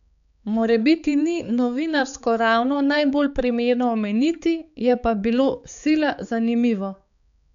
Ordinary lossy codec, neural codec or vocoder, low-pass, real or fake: none; codec, 16 kHz, 4 kbps, X-Codec, HuBERT features, trained on balanced general audio; 7.2 kHz; fake